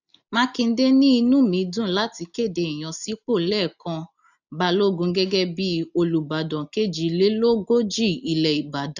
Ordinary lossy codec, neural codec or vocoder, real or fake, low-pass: none; none; real; 7.2 kHz